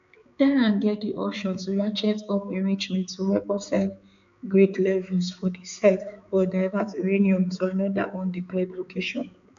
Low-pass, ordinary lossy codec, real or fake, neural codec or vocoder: 7.2 kHz; AAC, 64 kbps; fake; codec, 16 kHz, 4 kbps, X-Codec, HuBERT features, trained on balanced general audio